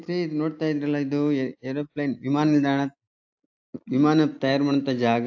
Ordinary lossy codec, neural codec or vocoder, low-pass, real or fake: AAC, 48 kbps; none; 7.2 kHz; real